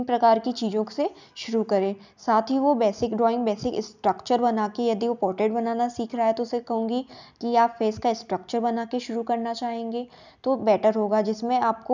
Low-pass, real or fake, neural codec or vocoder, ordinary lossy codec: 7.2 kHz; real; none; none